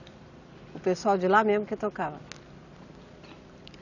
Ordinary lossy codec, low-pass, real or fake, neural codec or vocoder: none; 7.2 kHz; real; none